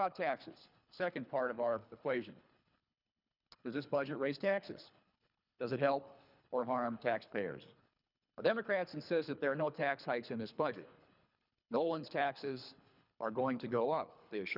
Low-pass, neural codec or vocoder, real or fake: 5.4 kHz; codec, 24 kHz, 3 kbps, HILCodec; fake